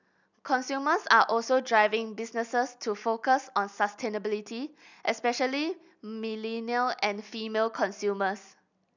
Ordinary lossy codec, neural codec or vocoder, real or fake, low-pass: none; none; real; 7.2 kHz